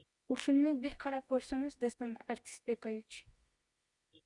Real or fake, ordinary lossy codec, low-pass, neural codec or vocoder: fake; Opus, 64 kbps; 10.8 kHz; codec, 24 kHz, 0.9 kbps, WavTokenizer, medium music audio release